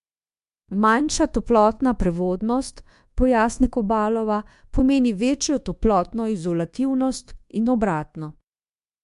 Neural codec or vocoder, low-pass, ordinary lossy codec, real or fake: codec, 24 kHz, 1.2 kbps, DualCodec; 10.8 kHz; MP3, 64 kbps; fake